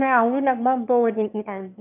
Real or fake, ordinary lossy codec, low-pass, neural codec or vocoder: fake; none; 3.6 kHz; autoencoder, 22.05 kHz, a latent of 192 numbers a frame, VITS, trained on one speaker